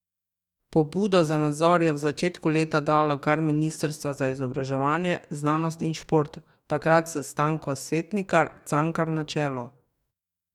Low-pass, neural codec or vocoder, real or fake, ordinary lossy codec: 19.8 kHz; codec, 44.1 kHz, 2.6 kbps, DAC; fake; none